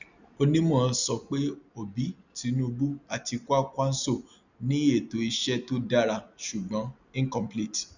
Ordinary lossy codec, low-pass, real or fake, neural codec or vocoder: none; 7.2 kHz; real; none